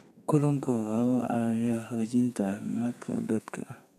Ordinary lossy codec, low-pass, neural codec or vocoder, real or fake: none; 14.4 kHz; codec, 32 kHz, 1.9 kbps, SNAC; fake